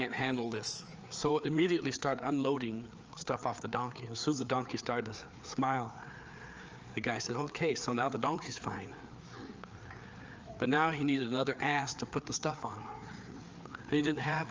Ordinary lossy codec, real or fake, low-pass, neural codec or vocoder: Opus, 24 kbps; fake; 7.2 kHz; codec, 16 kHz, 4 kbps, FreqCodec, larger model